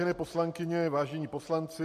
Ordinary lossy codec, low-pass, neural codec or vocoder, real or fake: MP3, 64 kbps; 14.4 kHz; none; real